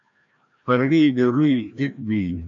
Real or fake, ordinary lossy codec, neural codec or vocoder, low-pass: fake; AAC, 64 kbps; codec, 16 kHz, 1 kbps, FreqCodec, larger model; 7.2 kHz